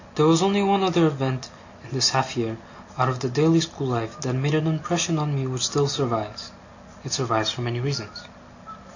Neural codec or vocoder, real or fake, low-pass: none; real; 7.2 kHz